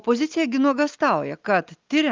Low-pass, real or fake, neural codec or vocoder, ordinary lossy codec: 7.2 kHz; real; none; Opus, 32 kbps